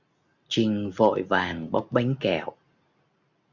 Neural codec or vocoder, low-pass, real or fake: none; 7.2 kHz; real